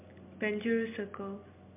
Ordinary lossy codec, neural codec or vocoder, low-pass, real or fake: MP3, 32 kbps; none; 3.6 kHz; real